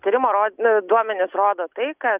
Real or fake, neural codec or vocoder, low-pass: real; none; 3.6 kHz